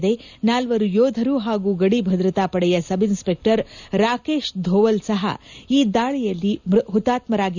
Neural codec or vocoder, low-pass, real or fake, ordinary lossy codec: none; 7.2 kHz; real; none